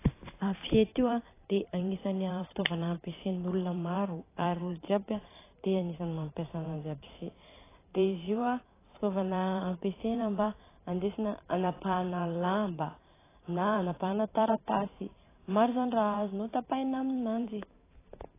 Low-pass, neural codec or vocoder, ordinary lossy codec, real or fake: 3.6 kHz; vocoder, 44.1 kHz, 128 mel bands every 512 samples, BigVGAN v2; AAC, 16 kbps; fake